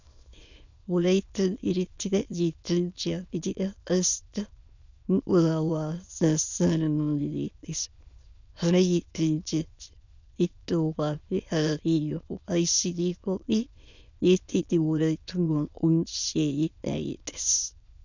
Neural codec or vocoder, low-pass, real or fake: autoencoder, 22.05 kHz, a latent of 192 numbers a frame, VITS, trained on many speakers; 7.2 kHz; fake